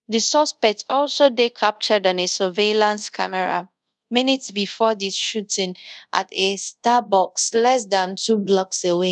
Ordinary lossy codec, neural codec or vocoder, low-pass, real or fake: none; codec, 24 kHz, 0.5 kbps, DualCodec; 10.8 kHz; fake